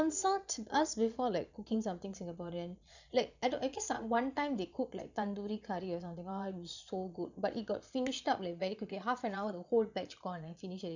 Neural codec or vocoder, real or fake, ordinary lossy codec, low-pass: vocoder, 22.05 kHz, 80 mel bands, Vocos; fake; none; 7.2 kHz